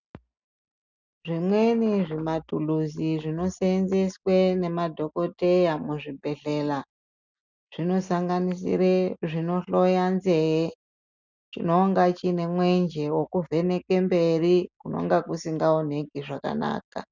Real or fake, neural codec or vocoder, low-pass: real; none; 7.2 kHz